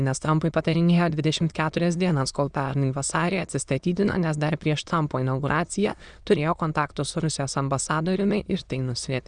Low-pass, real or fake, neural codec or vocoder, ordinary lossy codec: 9.9 kHz; fake; autoencoder, 22.05 kHz, a latent of 192 numbers a frame, VITS, trained on many speakers; Opus, 64 kbps